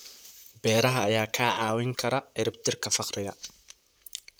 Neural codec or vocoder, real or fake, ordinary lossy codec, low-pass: vocoder, 44.1 kHz, 128 mel bands, Pupu-Vocoder; fake; none; none